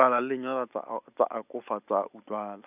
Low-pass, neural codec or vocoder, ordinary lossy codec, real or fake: 3.6 kHz; none; none; real